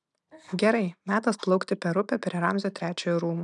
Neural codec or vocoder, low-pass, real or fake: none; 10.8 kHz; real